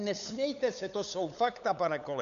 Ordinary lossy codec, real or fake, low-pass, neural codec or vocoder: AAC, 96 kbps; fake; 7.2 kHz; codec, 16 kHz, 8 kbps, FunCodec, trained on LibriTTS, 25 frames a second